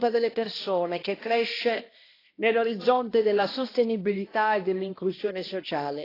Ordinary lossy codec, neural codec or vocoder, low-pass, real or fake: AAC, 24 kbps; codec, 16 kHz, 1 kbps, X-Codec, HuBERT features, trained on balanced general audio; 5.4 kHz; fake